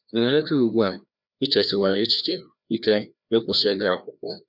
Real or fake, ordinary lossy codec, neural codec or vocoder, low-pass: fake; none; codec, 16 kHz, 1 kbps, FreqCodec, larger model; 5.4 kHz